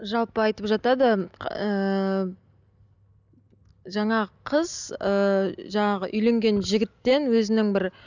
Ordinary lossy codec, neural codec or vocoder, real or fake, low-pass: none; none; real; 7.2 kHz